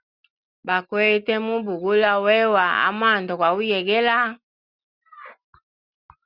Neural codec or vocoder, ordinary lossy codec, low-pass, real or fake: none; Opus, 64 kbps; 5.4 kHz; real